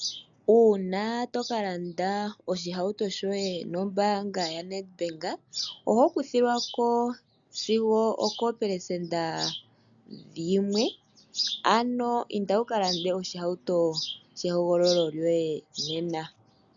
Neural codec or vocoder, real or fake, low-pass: none; real; 7.2 kHz